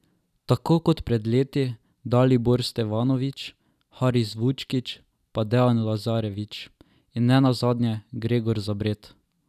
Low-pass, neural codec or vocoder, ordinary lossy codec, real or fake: 14.4 kHz; none; none; real